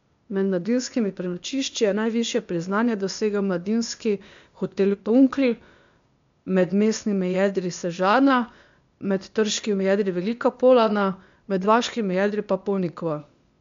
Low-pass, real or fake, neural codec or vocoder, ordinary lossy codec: 7.2 kHz; fake; codec, 16 kHz, 0.8 kbps, ZipCodec; MP3, 64 kbps